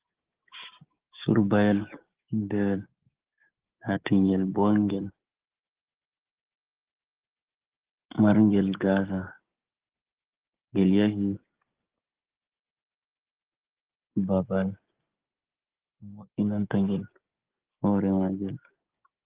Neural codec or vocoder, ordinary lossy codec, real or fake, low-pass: codec, 16 kHz, 6 kbps, DAC; Opus, 16 kbps; fake; 3.6 kHz